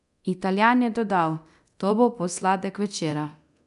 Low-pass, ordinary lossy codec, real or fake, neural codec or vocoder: 10.8 kHz; none; fake; codec, 24 kHz, 0.9 kbps, DualCodec